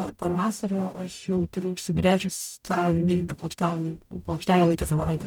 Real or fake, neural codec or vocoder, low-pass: fake; codec, 44.1 kHz, 0.9 kbps, DAC; 19.8 kHz